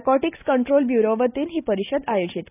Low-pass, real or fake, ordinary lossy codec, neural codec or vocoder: 3.6 kHz; real; none; none